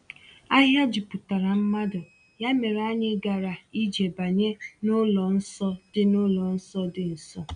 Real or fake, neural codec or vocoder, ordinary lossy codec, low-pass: real; none; none; 9.9 kHz